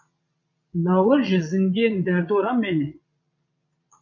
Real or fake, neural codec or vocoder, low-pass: fake; vocoder, 22.05 kHz, 80 mel bands, Vocos; 7.2 kHz